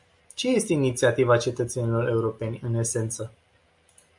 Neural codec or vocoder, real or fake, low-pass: none; real; 10.8 kHz